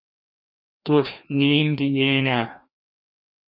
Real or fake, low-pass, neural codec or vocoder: fake; 5.4 kHz; codec, 16 kHz, 1 kbps, FreqCodec, larger model